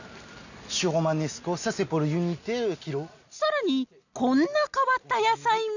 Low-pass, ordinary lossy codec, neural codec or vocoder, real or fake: 7.2 kHz; none; none; real